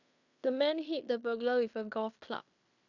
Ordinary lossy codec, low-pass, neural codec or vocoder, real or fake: none; 7.2 kHz; codec, 16 kHz in and 24 kHz out, 0.9 kbps, LongCat-Audio-Codec, fine tuned four codebook decoder; fake